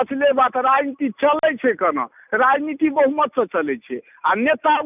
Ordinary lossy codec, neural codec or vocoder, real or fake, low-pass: none; none; real; 3.6 kHz